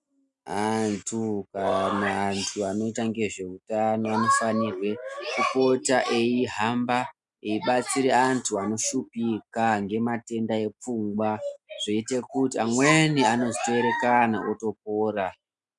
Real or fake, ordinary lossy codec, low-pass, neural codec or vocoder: real; MP3, 96 kbps; 10.8 kHz; none